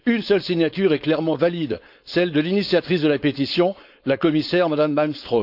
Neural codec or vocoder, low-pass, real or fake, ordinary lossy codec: codec, 16 kHz, 4.8 kbps, FACodec; 5.4 kHz; fake; none